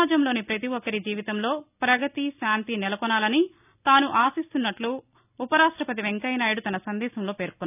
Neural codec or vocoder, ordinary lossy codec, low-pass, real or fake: none; none; 3.6 kHz; real